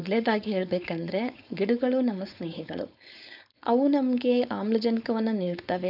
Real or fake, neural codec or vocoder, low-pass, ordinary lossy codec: fake; codec, 16 kHz, 4.8 kbps, FACodec; 5.4 kHz; none